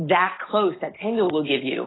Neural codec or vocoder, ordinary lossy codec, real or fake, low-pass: none; AAC, 16 kbps; real; 7.2 kHz